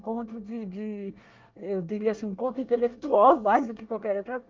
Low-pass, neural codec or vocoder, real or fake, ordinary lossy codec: 7.2 kHz; codec, 24 kHz, 1 kbps, SNAC; fake; Opus, 24 kbps